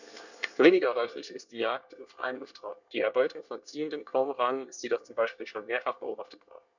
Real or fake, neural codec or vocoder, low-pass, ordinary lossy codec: fake; codec, 24 kHz, 1 kbps, SNAC; 7.2 kHz; none